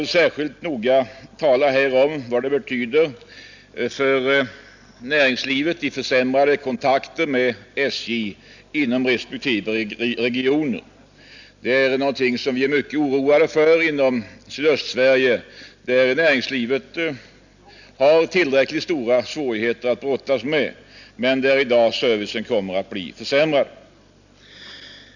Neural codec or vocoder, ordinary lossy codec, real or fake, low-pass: none; none; real; 7.2 kHz